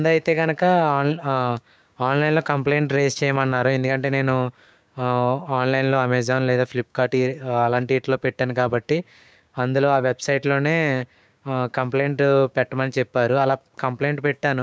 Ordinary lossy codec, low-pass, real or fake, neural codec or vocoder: none; none; fake; codec, 16 kHz, 6 kbps, DAC